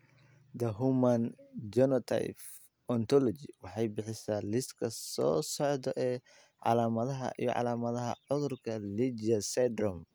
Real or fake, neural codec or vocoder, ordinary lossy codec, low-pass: real; none; none; none